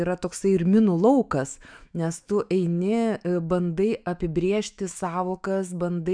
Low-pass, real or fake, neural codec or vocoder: 9.9 kHz; fake; autoencoder, 48 kHz, 128 numbers a frame, DAC-VAE, trained on Japanese speech